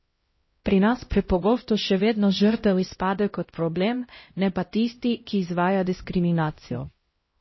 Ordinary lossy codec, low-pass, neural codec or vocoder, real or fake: MP3, 24 kbps; 7.2 kHz; codec, 16 kHz, 0.5 kbps, X-Codec, HuBERT features, trained on LibriSpeech; fake